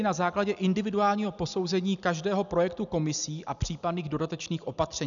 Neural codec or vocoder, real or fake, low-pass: none; real; 7.2 kHz